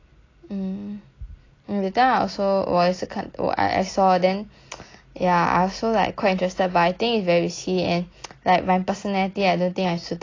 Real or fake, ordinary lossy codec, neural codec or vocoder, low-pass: real; AAC, 32 kbps; none; 7.2 kHz